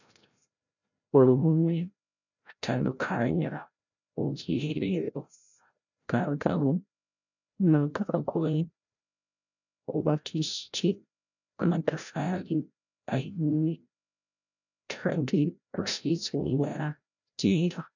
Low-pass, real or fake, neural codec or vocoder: 7.2 kHz; fake; codec, 16 kHz, 0.5 kbps, FreqCodec, larger model